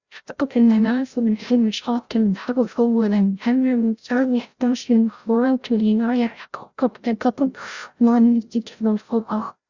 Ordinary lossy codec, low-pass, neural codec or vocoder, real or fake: Opus, 64 kbps; 7.2 kHz; codec, 16 kHz, 0.5 kbps, FreqCodec, larger model; fake